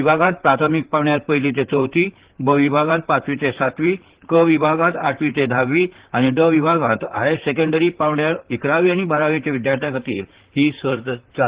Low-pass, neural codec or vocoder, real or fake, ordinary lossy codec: 3.6 kHz; vocoder, 44.1 kHz, 128 mel bands, Pupu-Vocoder; fake; Opus, 16 kbps